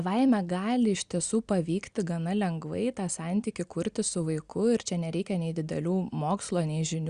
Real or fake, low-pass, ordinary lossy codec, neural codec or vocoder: real; 9.9 kHz; Opus, 64 kbps; none